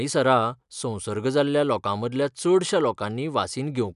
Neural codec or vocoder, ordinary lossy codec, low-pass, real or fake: none; none; 10.8 kHz; real